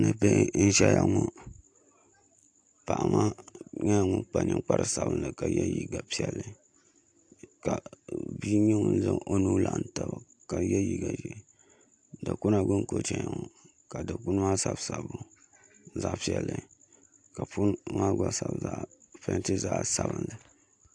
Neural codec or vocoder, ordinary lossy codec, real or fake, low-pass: none; AAC, 64 kbps; real; 9.9 kHz